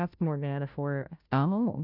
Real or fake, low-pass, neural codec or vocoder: fake; 5.4 kHz; codec, 16 kHz, 0.5 kbps, FunCodec, trained on Chinese and English, 25 frames a second